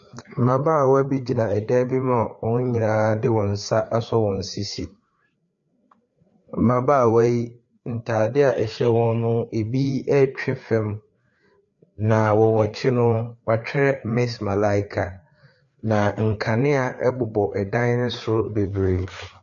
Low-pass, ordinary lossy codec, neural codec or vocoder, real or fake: 7.2 kHz; MP3, 48 kbps; codec, 16 kHz, 4 kbps, FreqCodec, larger model; fake